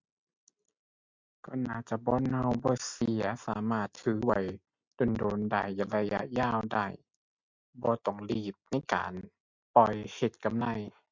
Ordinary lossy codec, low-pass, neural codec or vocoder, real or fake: MP3, 64 kbps; 7.2 kHz; none; real